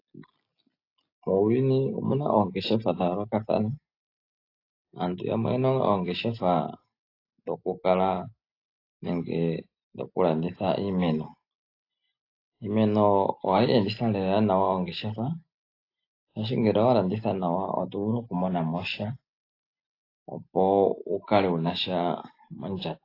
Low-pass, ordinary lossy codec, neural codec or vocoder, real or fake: 5.4 kHz; AAC, 32 kbps; none; real